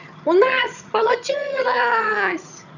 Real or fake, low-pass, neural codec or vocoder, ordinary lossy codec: fake; 7.2 kHz; vocoder, 22.05 kHz, 80 mel bands, HiFi-GAN; none